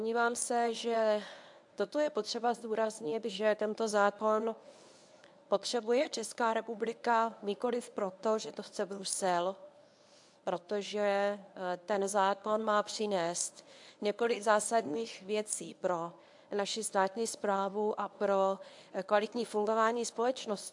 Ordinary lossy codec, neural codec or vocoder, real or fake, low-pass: AAC, 64 kbps; codec, 24 kHz, 0.9 kbps, WavTokenizer, medium speech release version 1; fake; 10.8 kHz